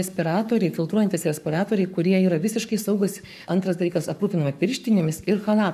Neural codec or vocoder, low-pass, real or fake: codec, 44.1 kHz, 7.8 kbps, Pupu-Codec; 14.4 kHz; fake